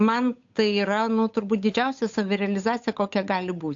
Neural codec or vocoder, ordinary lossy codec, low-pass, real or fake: codec, 16 kHz, 8 kbps, FunCodec, trained on Chinese and English, 25 frames a second; AAC, 64 kbps; 7.2 kHz; fake